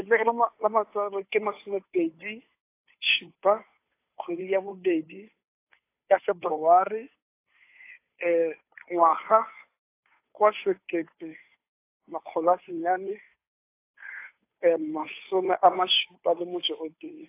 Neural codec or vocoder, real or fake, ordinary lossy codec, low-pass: codec, 16 kHz, 2 kbps, FunCodec, trained on Chinese and English, 25 frames a second; fake; AAC, 24 kbps; 3.6 kHz